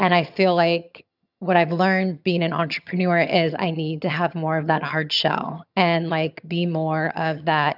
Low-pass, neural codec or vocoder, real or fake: 5.4 kHz; vocoder, 22.05 kHz, 80 mel bands, HiFi-GAN; fake